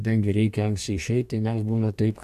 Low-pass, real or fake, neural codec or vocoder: 14.4 kHz; fake; codec, 44.1 kHz, 2.6 kbps, DAC